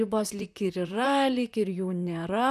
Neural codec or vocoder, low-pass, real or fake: vocoder, 44.1 kHz, 128 mel bands, Pupu-Vocoder; 14.4 kHz; fake